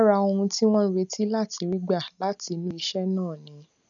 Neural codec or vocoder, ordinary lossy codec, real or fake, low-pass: none; none; real; 7.2 kHz